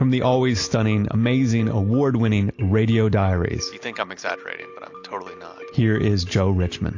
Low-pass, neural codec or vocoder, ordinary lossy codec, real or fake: 7.2 kHz; none; AAC, 48 kbps; real